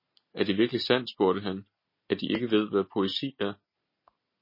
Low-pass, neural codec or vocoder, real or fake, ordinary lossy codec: 5.4 kHz; none; real; MP3, 24 kbps